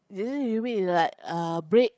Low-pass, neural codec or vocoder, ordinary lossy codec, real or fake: none; codec, 16 kHz, 8 kbps, FreqCodec, larger model; none; fake